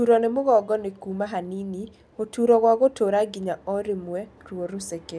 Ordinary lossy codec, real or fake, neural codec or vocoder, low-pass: none; real; none; none